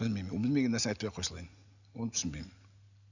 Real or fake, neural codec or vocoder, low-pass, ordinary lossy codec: real; none; 7.2 kHz; none